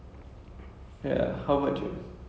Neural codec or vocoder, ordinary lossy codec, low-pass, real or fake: none; none; none; real